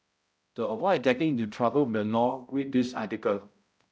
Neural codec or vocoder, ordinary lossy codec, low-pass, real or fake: codec, 16 kHz, 0.5 kbps, X-Codec, HuBERT features, trained on balanced general audio; none; none; fake